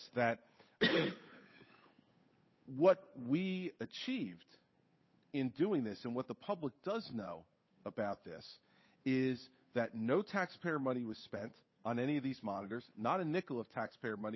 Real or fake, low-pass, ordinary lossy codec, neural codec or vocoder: fake; 7.2 kHz; MP3, 24 kbps; codec, 16 kHz, 8 kbps, FunCodec, trained on Chinese and English, 25 frames a second